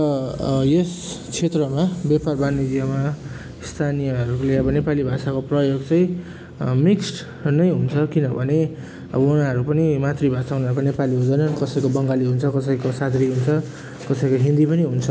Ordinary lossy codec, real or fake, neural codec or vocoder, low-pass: none; real; none; none